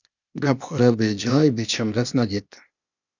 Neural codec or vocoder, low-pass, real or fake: codec, 16 kHz, 0.8 kbps, ZipCodec; 7.2 kHz; fake